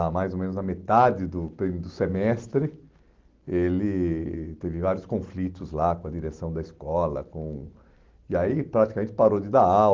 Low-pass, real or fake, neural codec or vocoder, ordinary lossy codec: 7.2 kHz; real; none; Opus, 16 kbps